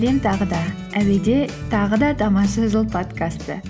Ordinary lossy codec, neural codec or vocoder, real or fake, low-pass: none; none; real; none